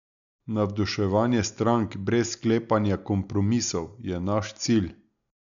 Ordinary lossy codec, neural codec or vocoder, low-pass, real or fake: none; none; 7.2 kHz; real